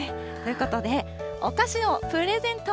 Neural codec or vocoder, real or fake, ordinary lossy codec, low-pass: none; real; none; none